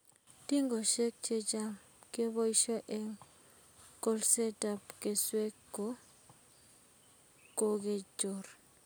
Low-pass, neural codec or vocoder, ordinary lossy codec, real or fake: none; none; none; real